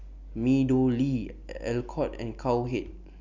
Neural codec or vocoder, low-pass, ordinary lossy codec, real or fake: none; 7.2 kHz; none; real